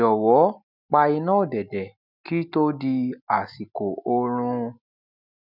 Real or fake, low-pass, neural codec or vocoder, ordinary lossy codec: real; 5.4 kHz; none; none